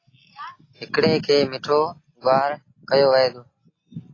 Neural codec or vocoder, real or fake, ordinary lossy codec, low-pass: none; real; AAC, 32 kbps; 7.2 kHz